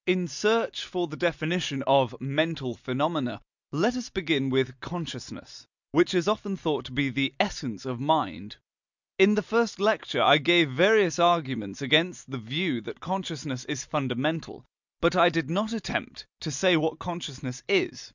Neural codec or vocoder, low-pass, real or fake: none; 7.2 kHz; real